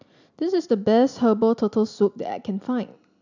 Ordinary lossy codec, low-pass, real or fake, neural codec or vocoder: none; 7.2 kHz; real; none